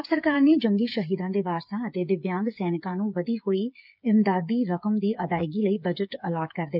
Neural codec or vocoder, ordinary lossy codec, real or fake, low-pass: codec, 16 kHz, 8 kbps, FreqCodec, smaller model; none; fake; 5.4 kHz